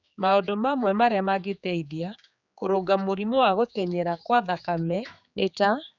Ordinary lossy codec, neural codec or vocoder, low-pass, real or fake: none; codec, 16 kHz, 4 kbps, X-Codec, HuBERT features, trained on general audio; 7.2 kHz; fake